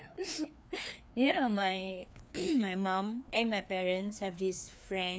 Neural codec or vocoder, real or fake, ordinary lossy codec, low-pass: codec, 16 kHz, 2 kbps, FreqCodec, larger model; fake; none; none